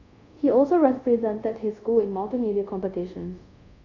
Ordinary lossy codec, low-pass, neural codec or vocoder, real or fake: none; 7.2 kHz; codec, 24 kHz, 0.5 kbps, DualCodec; fake